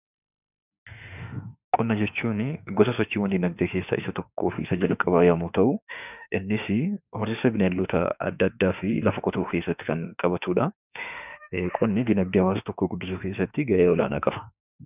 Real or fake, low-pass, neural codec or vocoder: fake; 3.6 kHz; autoencoder, 48 kHz, 32 numbers a frame, DAC-VAE, trained on Japanese speech